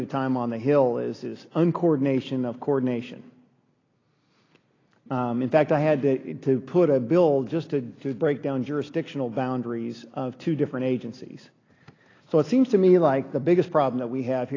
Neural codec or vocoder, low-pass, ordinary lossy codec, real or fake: none; 7.2 kHz; AAC, 32 kbps; real